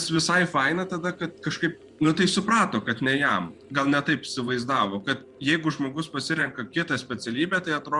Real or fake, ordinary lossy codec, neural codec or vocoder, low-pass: fake; Opus, 64 kbps; vocoder, 24 kHz, 100 mel bands, Vocos; 10.8 kHz